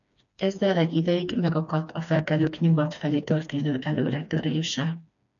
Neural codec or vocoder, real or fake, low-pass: codec, 16 kHz, 2 kbps, FreqCodec, smaller model; fake; 7.2 kHz